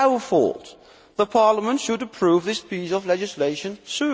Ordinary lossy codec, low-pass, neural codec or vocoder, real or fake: none; none; none; real